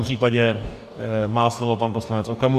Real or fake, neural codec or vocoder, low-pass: fake; codec, 44.1 kHz, 2.6 kbps, DAC; 14.4 kHz